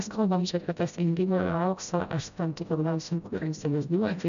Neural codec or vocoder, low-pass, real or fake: codec, 16 kHz, 0.5 kbps, FreqCodec, smaller model; 7.2 kHz; fake